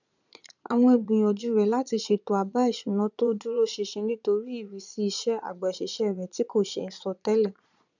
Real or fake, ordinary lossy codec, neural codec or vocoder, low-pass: fake; none; vocoder, 22.05 kHz, 80 mel bands, Vocos; 7.2 kHz